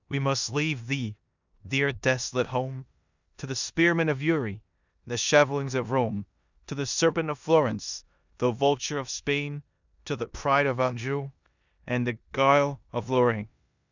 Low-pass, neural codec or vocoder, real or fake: 7.2 kHz; codec, 16 kHz in and 24 kHz out, 0.9 kbps, LongCat-Audio-Codec, four codebook decoder; fake